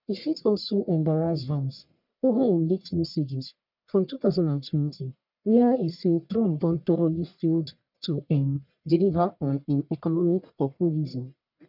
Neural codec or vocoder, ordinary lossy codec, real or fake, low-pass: codec, 44.1 kHz, 1.7 kbps, Pupu-Codec; none; fake; 5.4 kHz